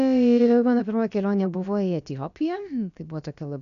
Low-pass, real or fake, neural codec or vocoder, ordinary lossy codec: 7.2 kHz; fake; codec, 16 kHz, about 1 kbps, DyCAST, with the encoder's durations; MP3, 96 kbps